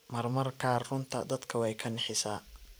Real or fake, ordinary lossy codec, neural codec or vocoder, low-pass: fake; none; vocoder, 44.1 kHz, 128 mel bands every 512 samples, BigVGAN v2; none